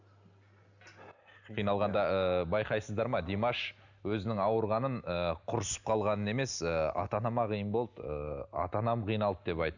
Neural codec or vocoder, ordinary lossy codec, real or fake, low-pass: none; none; real; 7.2 kHz